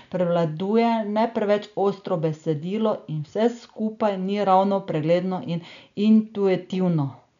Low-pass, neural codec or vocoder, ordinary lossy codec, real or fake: 7.2 kHz; none; none; real